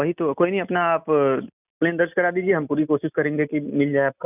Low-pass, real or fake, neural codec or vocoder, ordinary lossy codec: 3.6 kHz; real; none; none